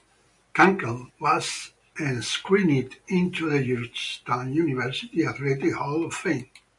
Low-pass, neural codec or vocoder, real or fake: 10.8 kHz; none; real